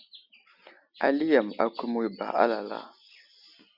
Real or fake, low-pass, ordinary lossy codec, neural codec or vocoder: real; 5.4 kHz; Opus, 64 kbps; none